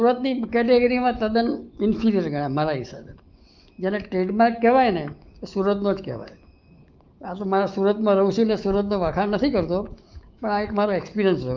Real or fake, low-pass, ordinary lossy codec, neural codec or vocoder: fake; 7.2 kHz; Opus, 32 kbps; autoencoder, 48 kHz, 128 numbers a frame, DAC-VAE, trained on Japanese speech